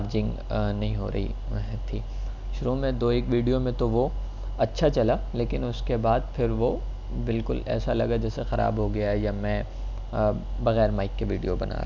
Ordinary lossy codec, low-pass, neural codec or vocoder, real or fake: none; 7.2 kHz; none; real